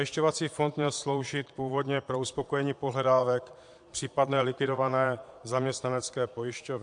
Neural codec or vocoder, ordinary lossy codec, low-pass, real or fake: vocoder, 22.05 kHz, 80 mel bands, Vocos; AAC, 64 kbps; 9.9 kHz; fake